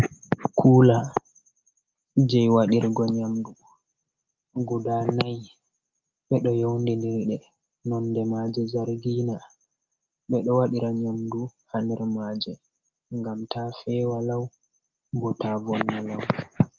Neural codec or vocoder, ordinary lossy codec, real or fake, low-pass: none; Opus, 24 kbps; real; 7.2 kHz